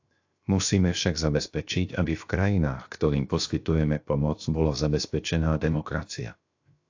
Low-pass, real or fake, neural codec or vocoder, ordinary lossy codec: 7.2 kHz; fake; codec, 16 kHz, 0.7 kbps, FocalCodec; AAC, 48 kbps